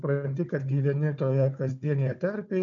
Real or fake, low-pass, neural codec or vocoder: fake; 7.2 kHz; codec, 16 kHz, 4 kbps, FunCodec, trained on Chinese and English, 50 frames a second